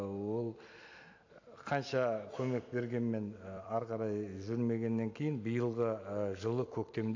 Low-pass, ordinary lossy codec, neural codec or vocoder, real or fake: 7.2 kHz; AAC, 48 kbps; vocoder, 44.1 kHz, 128 mel bands every 256 samples, BigVGAN v2; fake